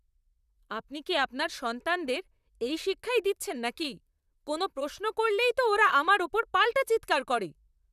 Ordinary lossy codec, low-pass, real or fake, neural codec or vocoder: none; 14.4 kHz; fake; vocoder, 44.1 kHz, 128 mel bands, Pupu-Vocoder